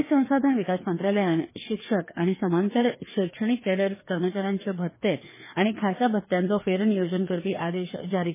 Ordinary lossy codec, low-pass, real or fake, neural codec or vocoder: MP3, 16 kbps; 3.6 kHz; fake; codec, 16 kHz, 2 kbps, FreqCodec, larger model